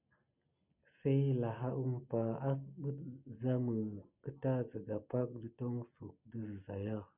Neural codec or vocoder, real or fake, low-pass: none; real; 3.6 kHz